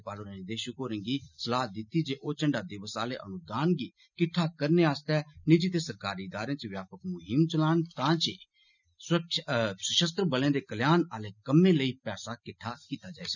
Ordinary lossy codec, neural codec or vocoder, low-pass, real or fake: none; none; 7.2 kHz; real